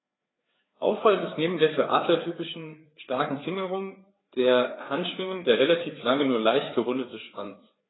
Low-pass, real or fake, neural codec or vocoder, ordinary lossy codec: 7.2 kHz; fake; codec, 16 kHz, 4 kbps, FreqCodec, larger model; AAC, 16 kbps